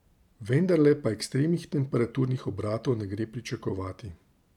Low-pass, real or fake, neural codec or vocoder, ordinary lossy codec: 19.8 kHz; fake; vocoder, 44.1 kHz, 128 mel bands every 512 samples, BigVGAN v2; none